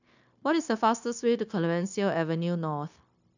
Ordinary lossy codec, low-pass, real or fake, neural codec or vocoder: none; 7.2 kHz; fake; codec, 16 kHz, 0.9 kbps, LongCat-Audio-Codec